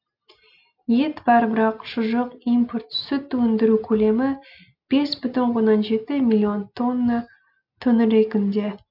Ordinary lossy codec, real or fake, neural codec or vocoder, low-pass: AAC, 48 kbps; real; none; 5.4 kHz